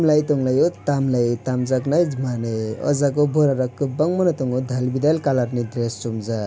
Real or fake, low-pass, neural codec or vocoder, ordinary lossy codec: real; none; none; none